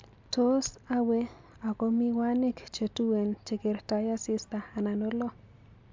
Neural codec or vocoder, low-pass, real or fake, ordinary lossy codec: none; 7.2 kHz; real; MP3, 64 kbps